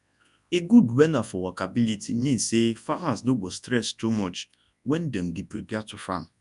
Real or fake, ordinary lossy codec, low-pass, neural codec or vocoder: fake; none; 10.8 kHz; codec, 24 kHz, 0.9 kbps, WavTokenizer, large speech release